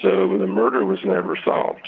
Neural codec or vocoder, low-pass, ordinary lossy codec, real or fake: vocoder, 22.05 kHz, 80 mel bands, WaveNeXt; 7.2 kHz; Opus, 24 kbps; fake